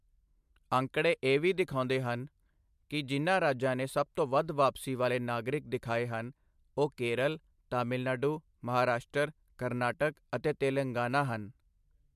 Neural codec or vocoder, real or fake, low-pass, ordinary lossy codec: none; real; 14.4 kHz; MP3, 96 kbps